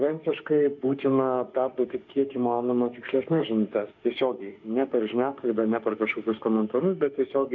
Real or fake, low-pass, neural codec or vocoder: fake; 7.2 kHz; autoencoder, 48 kHz, 32 numbers a frame, DAC-VAE, trained on Japanese speech